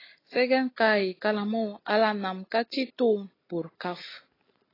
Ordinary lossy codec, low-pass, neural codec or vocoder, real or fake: AAC, 24 kbps; 5.4 kHz; none; real